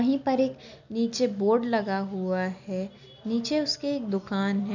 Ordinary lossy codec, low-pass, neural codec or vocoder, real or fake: none; 7.2 kHz; none; real